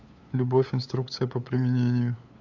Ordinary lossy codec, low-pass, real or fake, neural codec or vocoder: AAC, 48 kbps; 7.2 kHz; fake; codec, 16 kHz, 8 kbps, FunCodec, trained on LibriTTS, 25 frames a second